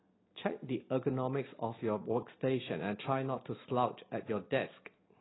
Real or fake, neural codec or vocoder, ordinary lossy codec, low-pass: real; none; AAC, 16 kbps; 7.2 kHz